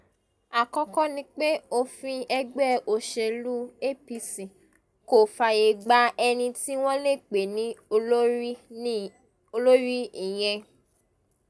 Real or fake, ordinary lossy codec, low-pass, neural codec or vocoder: real; none; none; none